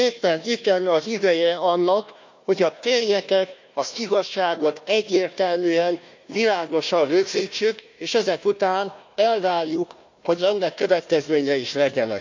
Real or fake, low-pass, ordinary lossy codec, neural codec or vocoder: fake; 7.2 kHz; MP3, 64 kbps; codec, 16 kHz, 1 kbps, FunCodec, trained on Chinese and English, 50 frames a second